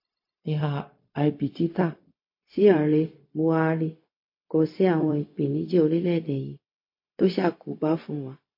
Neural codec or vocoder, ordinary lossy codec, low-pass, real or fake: codec, 16 kHz, 0.4 kbps, LongCat-Audio-Codec; MP3, 32 kbps; 5.4 kHz; fake